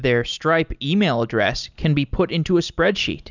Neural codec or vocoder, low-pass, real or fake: none; 7.2 kHz; real